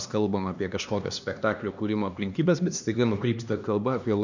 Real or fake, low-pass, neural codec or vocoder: fake; 7.2 kHz; codec, 16 kHz, 2 kbps, X-Codec, HuBERT features, trained on LibriSpeech